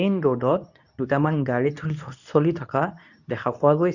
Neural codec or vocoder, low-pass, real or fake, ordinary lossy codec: codec, 24 kHz, 0.9 kbps, WavTokenizer, medium speech release version 2; 7.2 kHz; fake; none